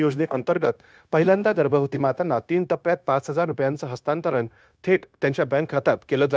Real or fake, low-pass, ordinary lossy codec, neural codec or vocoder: fake; none; none; codec, 16 kHz, 0.9 kbps, LongCat-Audio-Codec